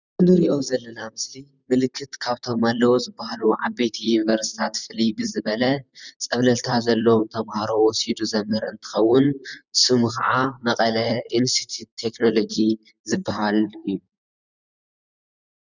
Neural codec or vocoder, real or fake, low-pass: vocoder, 44.1 kHz, 128 mel bands, Pupu-Vocoder; fake; 7.2 kHz